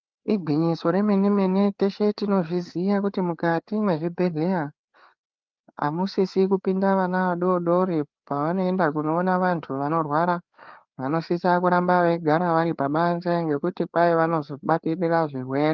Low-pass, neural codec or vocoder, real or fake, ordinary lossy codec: 7.2 kHz; codec, 16 kHz, 4 kbps, FreqCodec, larger model; fake; Opus, 32 kbps